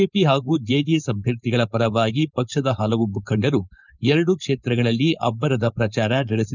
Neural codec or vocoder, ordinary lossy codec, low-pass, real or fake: codec, 16 kHz, 4.8 kbps, FACodec; none; 7.2 kHz; fake